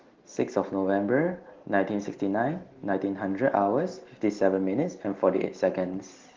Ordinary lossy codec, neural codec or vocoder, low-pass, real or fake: Opus, 16 kbps; none; 7.2 kHz; real